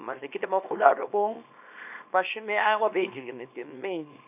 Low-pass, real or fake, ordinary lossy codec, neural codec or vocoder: 3.6 kHz; fake; none; codec, 24 kHz, 0.9 kbps, WavTokenizer, small release